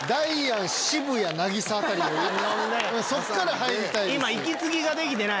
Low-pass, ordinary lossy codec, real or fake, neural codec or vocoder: none; none; real; none